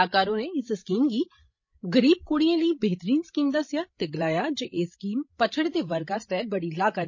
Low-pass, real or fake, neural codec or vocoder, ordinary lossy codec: 7.2 kHz; fake; codec, 16 kHz, 16 kbps, FreqCodec, larger model; MP3, 32 kbps